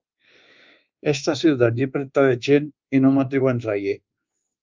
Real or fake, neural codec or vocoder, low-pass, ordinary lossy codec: fake; codec, 24 kHz, 1.2 kbps, DualCodec; 7.2 kHz; Opus, 32 kbps